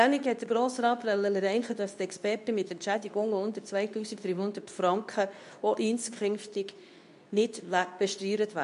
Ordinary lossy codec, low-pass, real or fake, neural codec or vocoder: AAC, 96 kbps; 10.8 kHz; fake; codec, 24 kHz, 0.9 kbps, WavTokenizer, medium speech release version 2